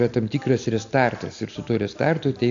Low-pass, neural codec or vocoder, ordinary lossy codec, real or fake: 7.2 kHz; none; AAC, 48 kbps; real